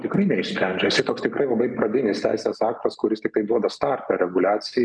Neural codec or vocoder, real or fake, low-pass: none; real; 9.9 kHz